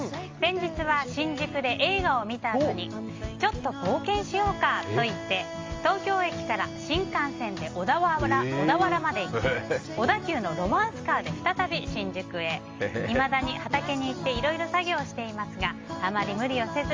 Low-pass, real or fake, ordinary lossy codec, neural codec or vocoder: 7.2 kHz; real; Opus, 32 kbps; none